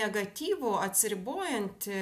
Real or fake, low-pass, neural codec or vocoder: real; 14.4 kHz; none